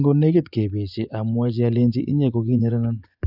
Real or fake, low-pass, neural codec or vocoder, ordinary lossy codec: fake; 5.4 kHz; vocoder, 24 kHz, 100 mel bands, Vocos; none